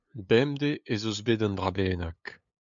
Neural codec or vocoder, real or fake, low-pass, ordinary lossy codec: codec, 16 kHz, 8 kbps, FunCodec, trained on LibriTTS, 25 frames a second; fake; 7.2 kHz; MP3, 96 kbps